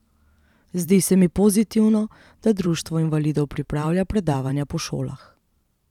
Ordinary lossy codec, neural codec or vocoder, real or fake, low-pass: none; vocoder, 44.1 kHz, 128 mel bands every 512 samples, BigVGAN v2; fake; 19.8 kHz